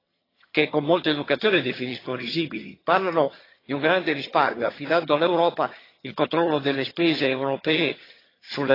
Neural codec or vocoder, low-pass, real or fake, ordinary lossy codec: vocoder, 22.05 kHz, 80 mel bands, HiFi-GAN; 5.4 kHz; fake; AAC, 24 kbps